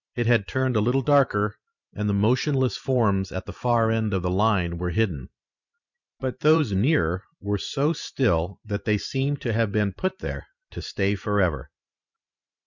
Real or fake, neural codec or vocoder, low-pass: fake; vocoder, 44.1 kHz, 128 mel bands every 512 samples, BigVGAN v2; 7.2 kHz